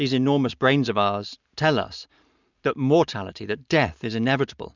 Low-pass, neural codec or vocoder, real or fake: 7.2 kHz; none; real